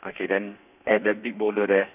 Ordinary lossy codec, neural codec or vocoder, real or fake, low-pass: none; codec, 32 kHz, 1.9 kbps, SNAC; fake; 3.6 kHz